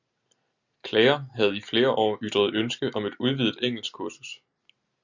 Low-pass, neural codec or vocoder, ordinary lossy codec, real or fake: 7.2 kHz; none; Opus, 64 kbps; real